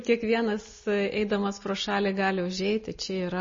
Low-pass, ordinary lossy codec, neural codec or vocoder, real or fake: 7.2 kHz; MP3, 32 kbps; none; real